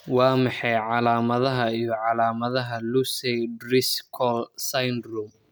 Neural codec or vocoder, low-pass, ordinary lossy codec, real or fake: none; none; none; real